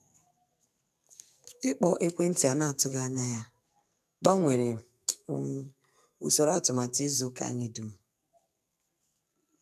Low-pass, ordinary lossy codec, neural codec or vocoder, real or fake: 14.4 kHz; none; codec, 44.1 kHz, 2.6 kbps, SNAC; fake